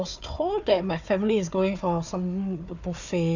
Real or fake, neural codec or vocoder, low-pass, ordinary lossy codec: fake; codec, 16 kHz, 4 kbps, FunCodec, trained on Chinese and English, 50 frames a second; 7.2 kHz; none